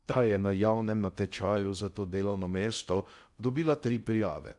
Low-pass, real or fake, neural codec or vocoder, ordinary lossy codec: 10.8 kHz; fake; codec, 16 kHz in and 24 kHz out, 0.6 kbps, FocalCodec, streaming, 2048 codes; none